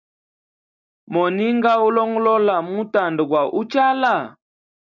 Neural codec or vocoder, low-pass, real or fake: none; 7.2 kHz; real